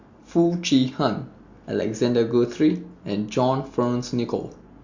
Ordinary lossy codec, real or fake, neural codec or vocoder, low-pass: Opus, 64 kbps; real; none; 7.2 kHz